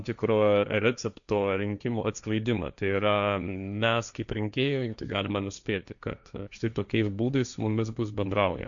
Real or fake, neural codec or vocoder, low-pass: fake; codec, 16 kHz, 1.1 kbps, Voila-Tokenizer; 7.2 kHz